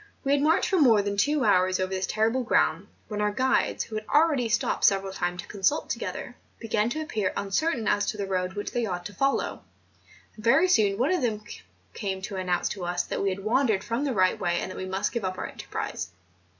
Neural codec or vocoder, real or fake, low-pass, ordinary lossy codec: none; real; 7.2 kHz; MP3, 64 kbps